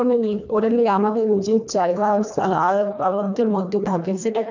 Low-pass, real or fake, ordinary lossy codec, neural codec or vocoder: 7.2 kHz; fake; none; codec, 24 kHz, 1.5 kbps, HILCodec